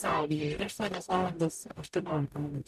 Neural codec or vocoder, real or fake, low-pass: codec, 44.1 kHz, 0.9 kbps, DAC; fake; 14.4 kHz